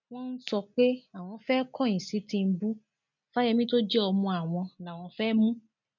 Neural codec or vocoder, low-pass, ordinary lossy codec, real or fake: none; 7.2 kHz; MP3, 64 kbps; real